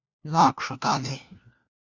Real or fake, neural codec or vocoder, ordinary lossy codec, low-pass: fake; codec, 16 kHz, 1 kbps, FunCodec, trained on LibriTTS, 50 frames a second; Opus, 64 kbps; 7.2 kHz